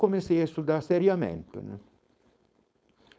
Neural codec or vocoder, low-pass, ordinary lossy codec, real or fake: codec, 16 kHz, 4.8 kbps, FACodec; none; none; fake